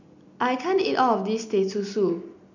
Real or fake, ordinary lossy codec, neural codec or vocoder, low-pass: real; none; none; 7.2 kHz